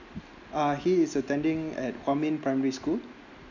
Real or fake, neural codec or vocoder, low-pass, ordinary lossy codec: real; none; 7.2 kHz; none